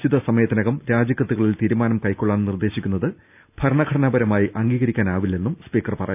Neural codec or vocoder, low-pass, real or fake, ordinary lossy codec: none; 3.6 kHz; real; none